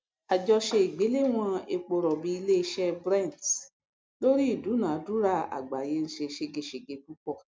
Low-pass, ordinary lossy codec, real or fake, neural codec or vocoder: none; none; real; none